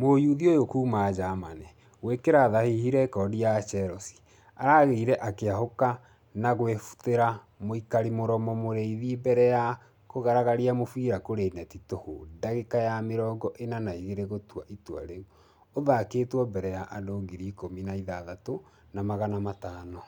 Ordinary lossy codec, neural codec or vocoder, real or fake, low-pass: none; none; real; 19.8 kHz